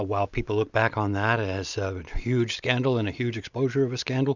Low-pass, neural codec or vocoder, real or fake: 7.2 kHz; none; real